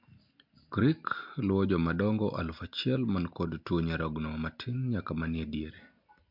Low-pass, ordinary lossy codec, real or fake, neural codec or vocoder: 5.4 kHz; MP3, 48 kbps; real; none